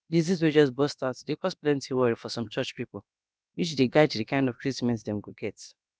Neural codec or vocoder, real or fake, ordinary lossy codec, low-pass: codec, 16 kHz, about 1 kbps, DyCAST, with the encoder's durations; fake; none; none